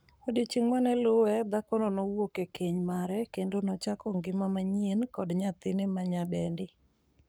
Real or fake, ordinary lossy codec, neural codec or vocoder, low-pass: fake; none; codec, 44.1 kHz, 7.8 kbps, Pupu-Codec; none